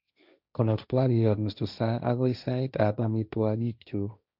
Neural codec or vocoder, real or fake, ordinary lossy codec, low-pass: codec, 16 kHz, 1.1 kbps, Voila-Tokenizer; fake; none; 5.4 kHz